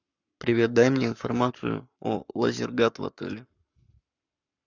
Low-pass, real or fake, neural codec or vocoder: 7.2 kHz; fake; codec, 44.1 kHz, 7.8 kbps, Pupu-Codec